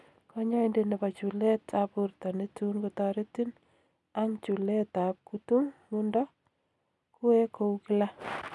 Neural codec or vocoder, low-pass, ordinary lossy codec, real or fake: none; none; none; real